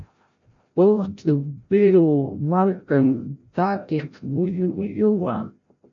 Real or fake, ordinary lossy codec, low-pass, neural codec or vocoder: fake; MP3, 48 kbps; 7.2 kHz; codec, 16 kHz, 0.5 kbps, FreqCodec, larger model